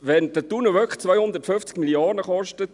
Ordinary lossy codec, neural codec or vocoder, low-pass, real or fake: none; none; 10.8 kHz; real